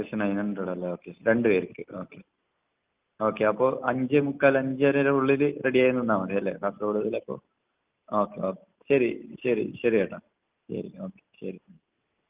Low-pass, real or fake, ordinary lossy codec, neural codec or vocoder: 3.6 kHz; real; Opus, 24 kbps; none